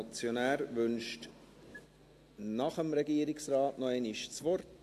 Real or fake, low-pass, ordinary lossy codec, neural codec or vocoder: real; 14.4 kHz; AAC, 64 kbps; none